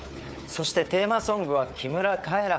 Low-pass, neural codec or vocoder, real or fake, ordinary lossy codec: none; codec, 16 kHz, 4 kbps, FunCodec, trained on Chinese and English, 50 frames a second; fake; none